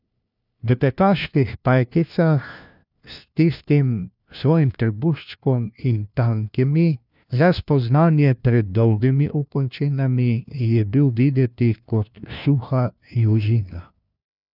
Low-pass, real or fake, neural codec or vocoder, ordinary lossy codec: 5.4 kHz; fake; codec, 16 kHz, 1 kbps, FunCodec, trained on LibriTTS, 50 frames a second; none